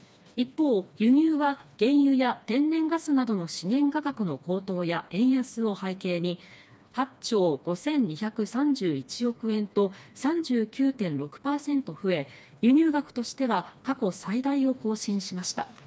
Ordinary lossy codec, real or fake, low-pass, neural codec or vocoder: none; fake; none; codec, 16 kHz, 2 kbps, FreqCodec, smaller model